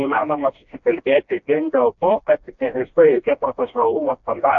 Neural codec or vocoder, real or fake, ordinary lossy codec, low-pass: codec, 16 kHz, 1 kbps, FreqCodec, smaller model; fake; AAC, 64 kbps; 7.2 kHz